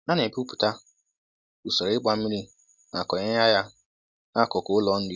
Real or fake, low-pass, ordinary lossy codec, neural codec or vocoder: real; none; none; none